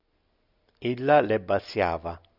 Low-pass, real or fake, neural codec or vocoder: 5.4 kHz; real; none